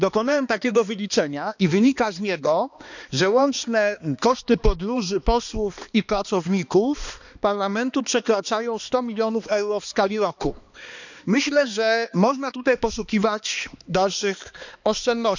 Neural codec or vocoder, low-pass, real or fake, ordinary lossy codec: codec, 16 kHz, 2 kbps, X-Codec, HuBERT features, trained on balanced general audio; 7.2 kHz; fake; none